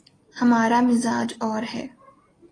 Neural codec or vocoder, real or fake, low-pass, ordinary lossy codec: none; real; 9.9 kHz; AAC, 32 kbps